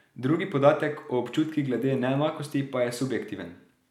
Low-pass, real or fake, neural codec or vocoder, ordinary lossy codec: 19.8 kHz; real; none; none